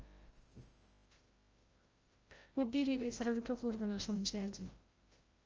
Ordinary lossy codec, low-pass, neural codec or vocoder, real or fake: Opus, 24 kbps; 7.2 kHz; codec, 16 kHz, 0.5 kbps, FreqCodec, larger model; fake